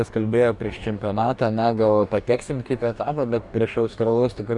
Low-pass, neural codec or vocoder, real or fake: 10.8 kHz; codec, 44.1 kHz, 2.6 kbps, DAC; fake